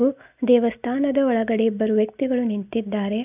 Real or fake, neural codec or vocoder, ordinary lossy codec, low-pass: real; none; none; 3.6 kHz